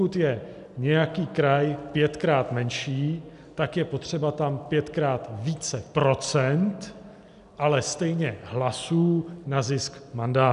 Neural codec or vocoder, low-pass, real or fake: none; 10.8 kHz; real